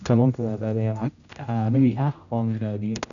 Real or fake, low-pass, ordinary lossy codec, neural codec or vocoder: fake; 7.2 kHz; none; codec, 16 kHz, 0.5 kbps, X-Codec, HuBERT features, trained on general audio